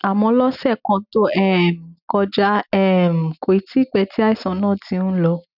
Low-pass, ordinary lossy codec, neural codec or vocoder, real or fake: 5.4 kHz; none; none; real